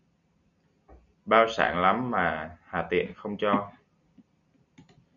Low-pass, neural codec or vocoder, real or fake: 7.2 kHz; none; real